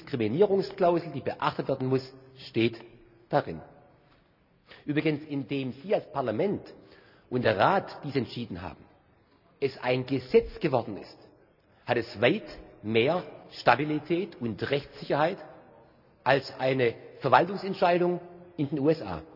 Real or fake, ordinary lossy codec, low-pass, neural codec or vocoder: real; none; 5.4 kHz; none